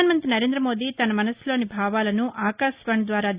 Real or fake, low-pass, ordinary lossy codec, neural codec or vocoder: real; 3.6 kHz; Opus, 64 kbps; none